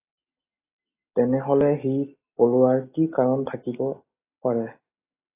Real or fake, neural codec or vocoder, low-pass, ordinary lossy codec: real; none; 3.6 kHz; AAC, 24 kbps